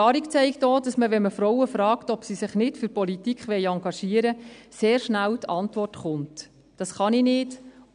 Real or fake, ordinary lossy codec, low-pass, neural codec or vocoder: real; none; 9.9 kHz; none